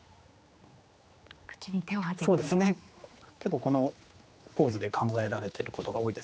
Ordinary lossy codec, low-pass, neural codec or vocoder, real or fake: none; none; codec, 16 kHz, 2 kbps, X-Codec, HuBERT features, trained on general audio; fake